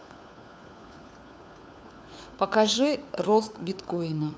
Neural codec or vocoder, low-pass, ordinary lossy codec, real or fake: codec, 16 kHz, 4 kbps, FunCodec, trained on LibriTTS, 50 frames a second; none; none; fake